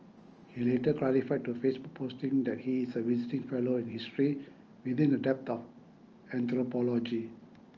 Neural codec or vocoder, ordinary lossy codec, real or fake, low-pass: none; Opus, 24 kbps; real; 7.2 kHz